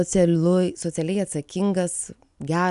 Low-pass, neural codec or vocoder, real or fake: 10.8 kHz; none; real